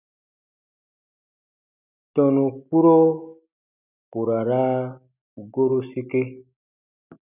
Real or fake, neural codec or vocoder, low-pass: real; none; 3.6 kHz